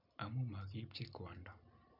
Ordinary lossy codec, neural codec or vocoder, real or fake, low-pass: none; none; real; 5.4 kHz